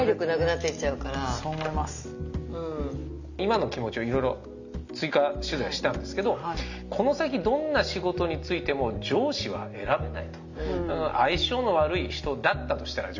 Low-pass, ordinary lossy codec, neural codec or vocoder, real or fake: 7.2 kHz; none; none; real